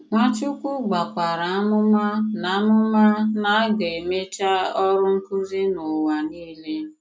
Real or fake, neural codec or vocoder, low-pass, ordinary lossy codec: real; none; none; none